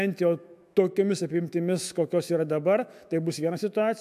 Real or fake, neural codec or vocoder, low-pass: fake; autoencoder, 48 kHz, 128 numbers a frame, DAC-VAE, trained on Japanese speech; 14.4 kHz